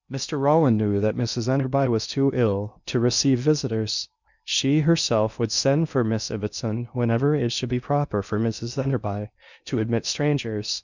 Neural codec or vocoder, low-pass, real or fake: codec, 16 kHz in and 24 kHz out, 0.6 kbps, FocalCodec, streaming, 2048 codes; 7.2 kHz; fake